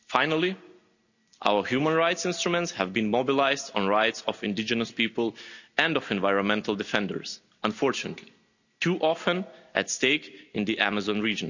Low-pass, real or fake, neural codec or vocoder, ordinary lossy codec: 7.2 kHz; real; none; none